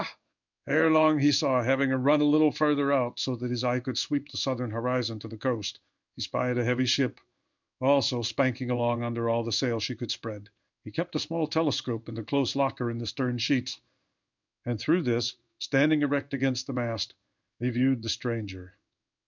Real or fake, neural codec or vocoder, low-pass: fake; codec, 16 kHz in and 24 kHz out, 1 kbps, XY-Tokenizer; 7.2 kHz